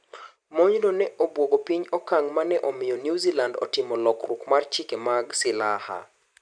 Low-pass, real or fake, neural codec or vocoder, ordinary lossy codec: 9.9 kHz; real; none; MP3, 96 kbps